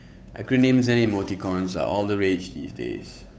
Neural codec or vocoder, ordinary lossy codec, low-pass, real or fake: codec, 16 kHz, 8 kbps, FunCodec, trained on Chinese and English, 25 frames a second; none; none; fake